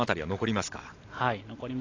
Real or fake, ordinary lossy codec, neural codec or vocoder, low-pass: real; AAC, 32 kbps; none; 7.2 kHz